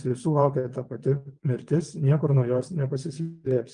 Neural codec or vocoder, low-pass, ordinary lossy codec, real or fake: vocoder, 22.05 kHz, 80 mel bands, WaveNeXt; 9.9 kHz; Opus, 32 kbps; fake